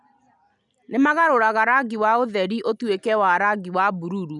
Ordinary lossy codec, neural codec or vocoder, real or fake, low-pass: none; none; real; 10.8 kHz